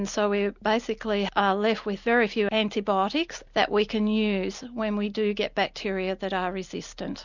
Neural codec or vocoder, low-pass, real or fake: none; 7.2 kHz; real